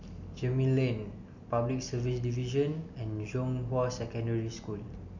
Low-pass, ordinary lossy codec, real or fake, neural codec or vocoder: 7.2 kHz; none; real; none